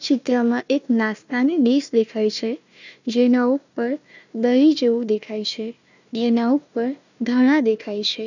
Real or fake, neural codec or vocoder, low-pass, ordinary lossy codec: fake; codec, 16 kHz, 1 kbps, FunCodec, trained on Chinese and English, 50 frames a second; 7.2 kHz; none